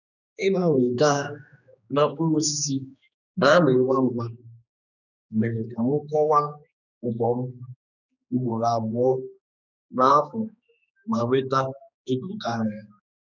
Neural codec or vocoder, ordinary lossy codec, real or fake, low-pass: codec, 16 kHz, 2 kbps, X-Codec, HuBERT features, trained on general audio; none; fake; 7.2 kHz